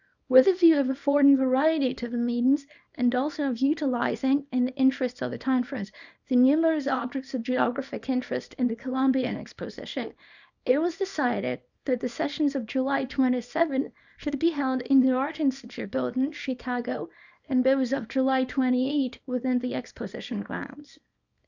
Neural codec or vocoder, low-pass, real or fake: codec, 24 kHz, 0.9 kbps, WavTokenizer, small release; 7.2 kHz; fake